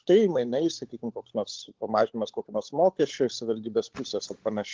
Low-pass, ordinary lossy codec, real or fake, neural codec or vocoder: 7.2 kHz; Opus, 16 kbps; fake; codec, 16 kHz, 16 kbps, FunCodec, trained on LibriTTS, 50 frames a second